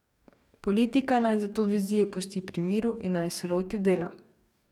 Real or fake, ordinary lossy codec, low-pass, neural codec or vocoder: fake; none; 19.8 kHz; codec, 44.1 kHz, 2.6 kbps, DAC